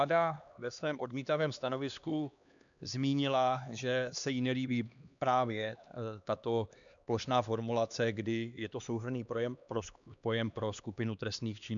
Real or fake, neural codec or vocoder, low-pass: fake; codec, 16 kHz, 2 kbps, X-Codec, HuBERT features, trained on LibriSpeech; 7.2 kHz